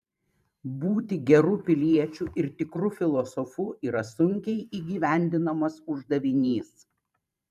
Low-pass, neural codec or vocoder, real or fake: 14.4 kHz; vocoder, 44.1 kHz, 128 mel bands every 512 samples, BigVGAN v2; fake